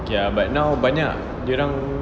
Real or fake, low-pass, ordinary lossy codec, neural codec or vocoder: real; none; none; none